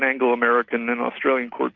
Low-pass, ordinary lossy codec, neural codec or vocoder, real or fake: 7.2 kHz; Opus, 64 kbps; none; real